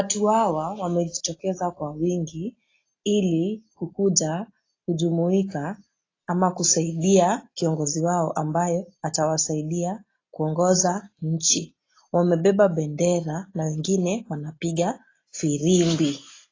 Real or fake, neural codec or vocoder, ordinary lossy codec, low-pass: real; none; AAC, 32 kbps; 7.2 kHz